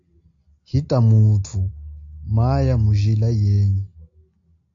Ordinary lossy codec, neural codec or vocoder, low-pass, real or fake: AAC, 48 kbps; none; 7.2 kHz; real